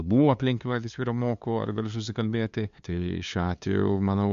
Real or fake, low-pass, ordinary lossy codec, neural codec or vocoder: fake; 7.2 kHz; MP3, 64 kbps; codec, 16 kHz, 2 kbps, FunCodec, trained on LibriTTS, 25 frames a second